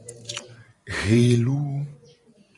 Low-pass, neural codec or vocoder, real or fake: 10.8 kHz; vocoder, 44.1 kHz, 128 mel bands every 512 samples, BigVGAN v2; fake